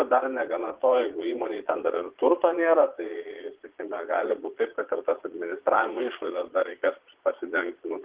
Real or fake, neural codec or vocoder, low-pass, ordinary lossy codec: fake; vocoder, 22.05 kHz, 80 mel bands, Vocos; 3.6 kHz; Opus, 16 kbps